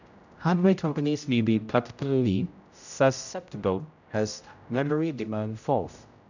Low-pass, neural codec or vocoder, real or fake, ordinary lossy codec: 7.2 kHz; codec, 16 kHz, 0.5 kbps, X-Codec, HuBERT features, trained on general audio; fake; none